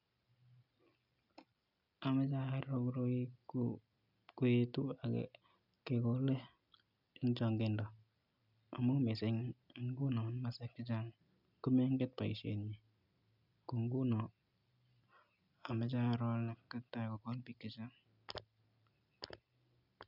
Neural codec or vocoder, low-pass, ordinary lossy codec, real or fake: none; 5.4 kHz; none; real